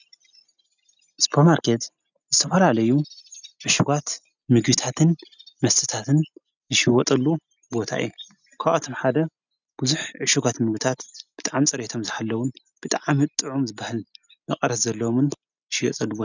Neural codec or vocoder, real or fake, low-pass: none; real; 7.2 kHz